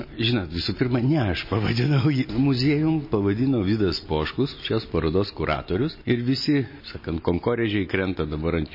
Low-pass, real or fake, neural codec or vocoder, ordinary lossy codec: 5.4 kHz; real; none; MP3, 24 kbps